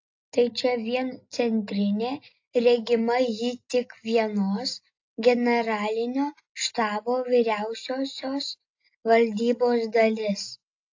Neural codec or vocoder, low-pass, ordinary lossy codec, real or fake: none; 7.2 kHz; AAC, 48 kbps; real